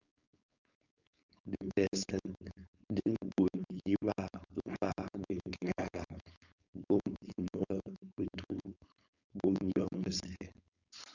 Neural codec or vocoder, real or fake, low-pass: codec, 16 kHz, 4.8 kbps, FACodec; fake; 7.2 kHz